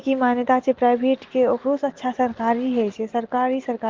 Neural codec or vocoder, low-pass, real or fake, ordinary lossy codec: none; 7.2 kHz; real; Opus, 16 kbps